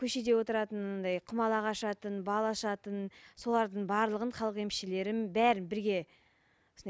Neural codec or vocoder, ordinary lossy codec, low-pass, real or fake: none; none; none; real